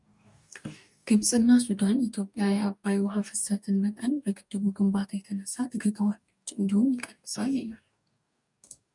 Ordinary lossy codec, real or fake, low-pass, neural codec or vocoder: MP3, 96 kbps; fake; 10.8 kHz; codec, 44.1 kHz, 2.6 kbps, DAC